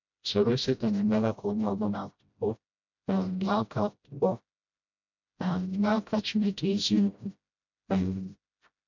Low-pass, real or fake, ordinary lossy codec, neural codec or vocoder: 7.2 kHz; fake; none; codec, 16 kHz, 0.5 kbps, FreqCodec, smaller model